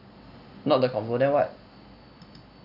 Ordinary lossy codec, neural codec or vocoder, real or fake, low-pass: none; none; real; 5.4 kHz